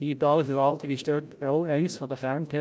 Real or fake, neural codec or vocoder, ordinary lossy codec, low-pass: fake; codec, 16 kHz, 0.5 kbps, FreqCodec, larger model; none; none